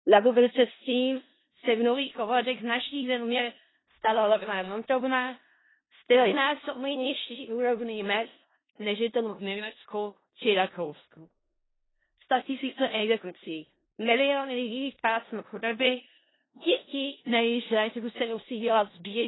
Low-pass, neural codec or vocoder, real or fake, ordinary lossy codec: 7.2 kHz; codec, 16 kHz in and 24 kHz out, 0.4 kbps, LongCat-Audio-Codec, four codebook decoder; fake; AAC, 16 kbps